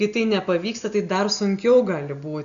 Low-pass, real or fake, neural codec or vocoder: 7.2 kHz; real; none